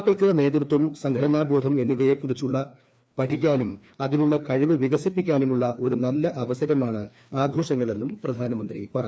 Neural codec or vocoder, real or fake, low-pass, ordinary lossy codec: codec, 16 kHz, 2 kbps, FreqCodec, larger model; fake; none; none